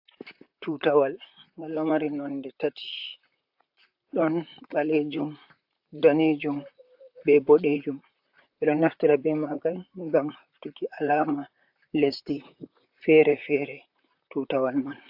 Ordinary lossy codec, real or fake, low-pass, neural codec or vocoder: AAC, 48 kbps; fake; 5.4 kHz; vocoder, 44.1 kHz, 128 mel bands, Pupu-Vocoder